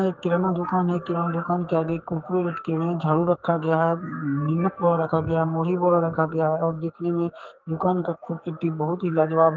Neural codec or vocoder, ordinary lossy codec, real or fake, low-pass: codec, 44.1 kHz, 2.6 kbps, SNAC; Opus, 32 kbps; fake; 7.2 kHz